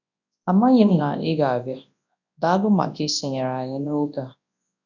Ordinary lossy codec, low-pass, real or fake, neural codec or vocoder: none; 7.2 kHz; fake; codec, 24 kHz, 0.9 kbps, WavTokenizer, large speech release